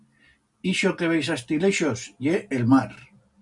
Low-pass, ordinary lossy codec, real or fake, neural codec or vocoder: 10.8 kHz; MP3, 64 kbps; real; none